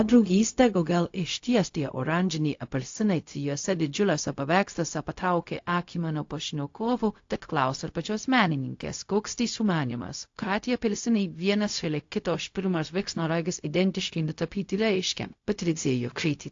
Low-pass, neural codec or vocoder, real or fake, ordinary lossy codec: 7.2 kHz; codec, 16 kHz, 0.4 kbps, LongCat-Audio-Codec; fake; AAC, 48 kbps